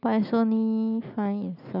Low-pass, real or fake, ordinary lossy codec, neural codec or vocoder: 5.4 kHz; real; none; none